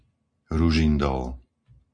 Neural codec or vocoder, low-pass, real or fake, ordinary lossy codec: none; 9.9 kHz; real; MP3, 48 kbps